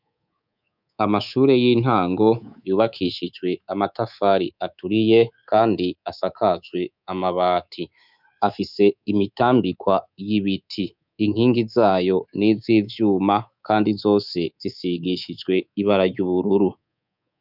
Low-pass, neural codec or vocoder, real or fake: 5.4 kHz; codec, 24 kHz, 3.1 kbps, DualCodec; fake